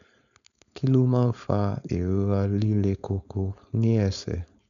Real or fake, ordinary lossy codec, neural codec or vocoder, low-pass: fake; none; codec, 16 kHz, 4.8 kbps, FACodec; 7.2 kHz